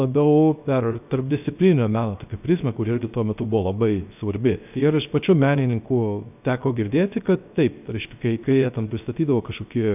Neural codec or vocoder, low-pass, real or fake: codec, 16 kHz, 0.3 kbps, FocalCodec; 3.6 kHz; fake